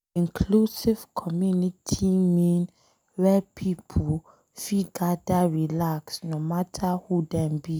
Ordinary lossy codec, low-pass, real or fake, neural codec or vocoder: none; none; real; none